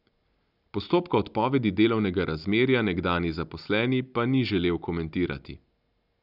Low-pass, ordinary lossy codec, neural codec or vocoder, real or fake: 5.4 kHz; none; none; real